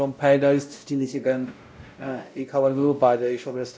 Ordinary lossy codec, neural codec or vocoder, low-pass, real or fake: none; codec, 16 kHz, 0.5 kbps, X-Codec, WavLM features, trained on Multilingual LibriSpeech; none; fake